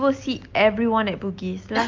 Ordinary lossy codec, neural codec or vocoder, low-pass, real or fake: Opus, 24 kbps; none; 7.2 kHz; real